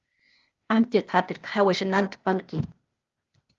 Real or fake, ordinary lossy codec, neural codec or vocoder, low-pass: fake; Opus, 32 kbps; codec, 16 kHz, 0.8 kbps, ZipCodec; 7.2 kHz